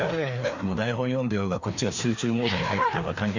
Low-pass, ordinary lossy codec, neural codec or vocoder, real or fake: 7.2 kHz; none; codec, 16 kHz, 2 kbps, FreqCodec, larger model; fake